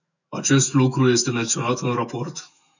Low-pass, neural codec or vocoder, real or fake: 7.2 kHz; autoencoder, 48 kHz, 128 numbers a frame, DAC-VAE, trained on Japanese speech; fake